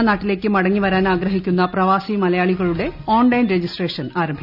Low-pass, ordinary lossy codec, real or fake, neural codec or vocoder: 5.4 kHz; none; real; none